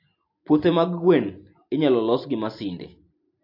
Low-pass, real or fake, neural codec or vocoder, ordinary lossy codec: 5.4 kHz; real; none; MP3, 32 kbps